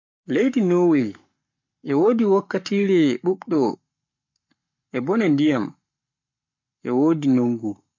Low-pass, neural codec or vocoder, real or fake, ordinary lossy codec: 7.2 kHz; codec, 44.1 kHz, 7.8 kbps, Pupu-Codec; fake; MP3, 48 kbps